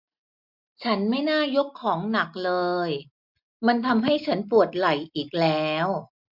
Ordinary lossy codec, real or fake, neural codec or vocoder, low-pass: MP3, 48 kbps; real; none; 5.4 kHz